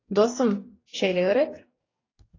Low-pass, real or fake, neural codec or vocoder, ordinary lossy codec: 7.2 kHz; fake; codec, 44.1 kHz, 2.6 kbps, DAC; AAC, 32 kbps